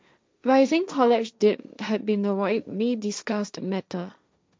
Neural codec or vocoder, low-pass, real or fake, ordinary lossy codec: codec, 16 kHz, 1.1 kbps, Voila-Tokenizer; none; fake; none